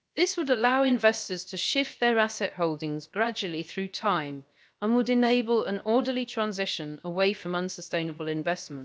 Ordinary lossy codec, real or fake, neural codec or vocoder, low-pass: none; fake; codec, 16 kHz, 0.7 kbps, FocalCodec; none